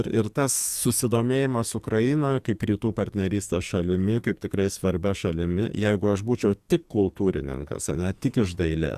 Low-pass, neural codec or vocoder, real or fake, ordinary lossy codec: 14.4 kHz; codec, 44.1 kHz, 2.6 kbps, SNAC; fake; Opus, 64 kbps